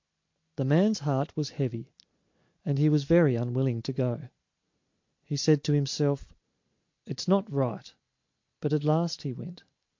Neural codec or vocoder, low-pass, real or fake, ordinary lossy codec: none; 7.2 kHz; real; MP3, 48 kbps